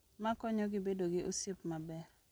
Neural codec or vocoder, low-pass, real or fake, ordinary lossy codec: none; none; real; none